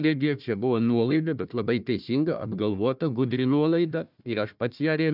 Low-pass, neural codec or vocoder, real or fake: 5.4 kHz; codec, 16 kHz, 1 kbps, FunCodec, trained on Chinese and English, 50 frames a second; fake